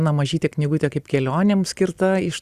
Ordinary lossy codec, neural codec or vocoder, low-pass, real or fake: Opus, 64 kbps; none; 14.4 kHz; real